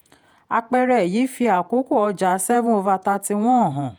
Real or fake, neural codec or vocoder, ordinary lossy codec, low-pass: fake; vocoder, 48 kHz, 128 mel bands, Vocos; none; none